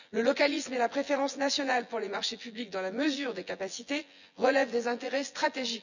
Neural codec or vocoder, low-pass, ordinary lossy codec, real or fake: vocoder, 24 kHz, 100 mel bands, Vocos; 7.2 kHz; none; fake